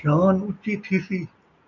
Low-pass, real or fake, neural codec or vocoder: 7.2 kHz; real; none